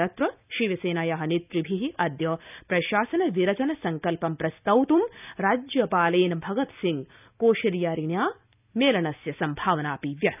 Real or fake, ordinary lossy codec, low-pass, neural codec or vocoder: real; none; 3.6 kHz; none